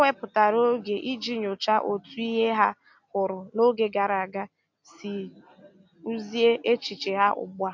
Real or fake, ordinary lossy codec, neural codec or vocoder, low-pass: real; MP3, 48 kbps; none; 7.2 kHz